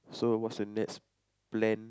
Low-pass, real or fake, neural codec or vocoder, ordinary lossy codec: none; real; none; none